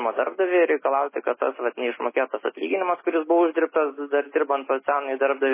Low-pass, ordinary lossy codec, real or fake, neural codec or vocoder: 3.6 kHz; MP3, 16 kbps; real; none